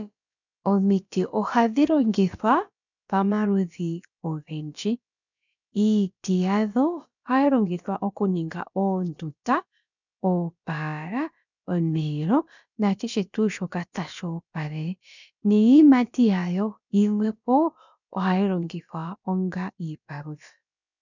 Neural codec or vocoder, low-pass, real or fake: codec, 16 kHz, about 1 kbps, DyCAST, with the encoder's durations; 7.2 kHz; fake